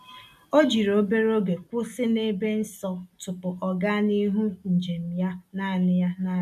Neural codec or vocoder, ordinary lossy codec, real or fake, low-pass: none; none; real; 14.4 kHz